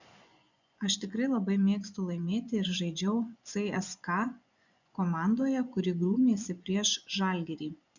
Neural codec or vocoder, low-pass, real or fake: vocoder, 24 kHz, 100 mel bands, Vocos; 7.2 kHz; fake